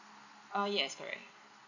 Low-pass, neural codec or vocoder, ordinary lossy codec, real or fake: 7.2 kHz; none; none; real